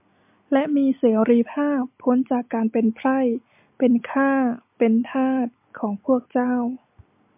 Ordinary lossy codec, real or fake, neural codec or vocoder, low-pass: MP3, 32 kbps; real; none; 3.6 kHz